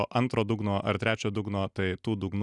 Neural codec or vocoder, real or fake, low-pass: none; real; 10.8 kHz